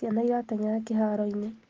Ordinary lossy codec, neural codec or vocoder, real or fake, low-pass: Opus, 16 kbps; none; real; 7.2 kHz